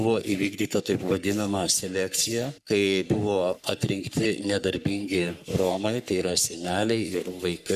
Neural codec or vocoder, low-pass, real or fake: codec, 44.1 kHz, 3.4 kbps, Pupu-Codec; 14.4 kHz; fake